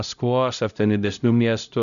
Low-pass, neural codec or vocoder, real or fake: 7.2 kHz; codec, 16 kHz, 0.5 kbps, X-Codec, HuBERT features, trained on LibriSpeech; fake